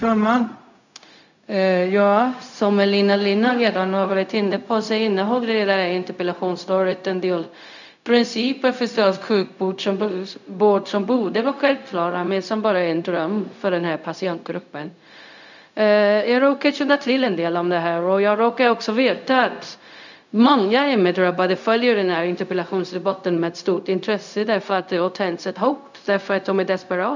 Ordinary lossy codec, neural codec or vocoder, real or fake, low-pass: none; codec, 16 kHz, 0.4 kbps, LongCat-Audio-Codec; fake; 7.2 kHz